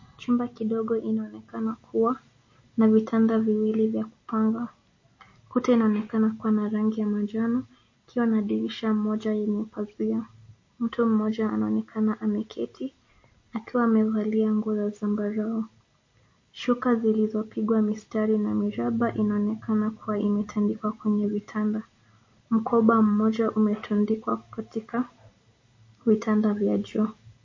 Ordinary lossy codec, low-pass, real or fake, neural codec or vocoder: MP3, 32 kbps; 7.2 kHz; real; none